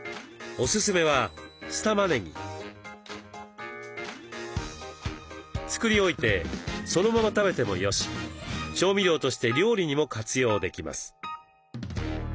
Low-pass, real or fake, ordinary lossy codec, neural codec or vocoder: none; real; none; none